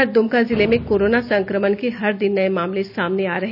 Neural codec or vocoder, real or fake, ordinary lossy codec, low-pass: none; real; none; 5.4 kHz